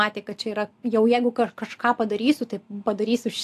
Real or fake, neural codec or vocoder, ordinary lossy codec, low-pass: real; none; AAC, 64 kbps; 14.4 kHz